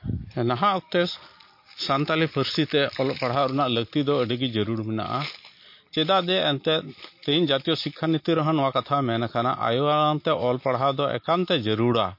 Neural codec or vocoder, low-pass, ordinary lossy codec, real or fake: none; 5.4 kHz; MP3, 32 kbps; real